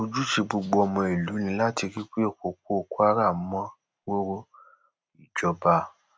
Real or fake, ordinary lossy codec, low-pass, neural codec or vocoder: real; none; none; none